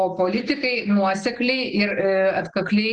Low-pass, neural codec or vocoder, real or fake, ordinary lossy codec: 9.9 kHz; none; real; Opus, 16 kbps